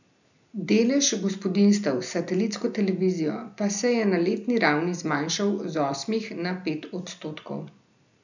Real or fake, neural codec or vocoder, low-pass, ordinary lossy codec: real; none; 7.2 kHz; none